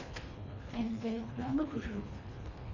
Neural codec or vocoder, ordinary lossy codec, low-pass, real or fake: codec, 24 kHz, 1.5 kbps, HILCodec; none; 7.2 kHz; fake